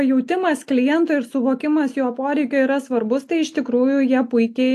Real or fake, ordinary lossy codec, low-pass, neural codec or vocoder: real; AAC, 64 kbps; 14.4 kHz; none